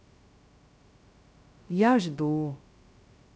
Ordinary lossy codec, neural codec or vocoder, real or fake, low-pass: none; codec, 16 kHz, 0.2 kbps, FocalCodec; fake; none